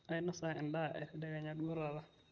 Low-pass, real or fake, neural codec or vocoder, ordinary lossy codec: 7.2 kHz; real; none; Opus, 24 kbps